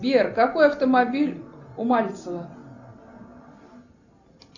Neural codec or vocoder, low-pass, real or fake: none; 7.2 kHz; real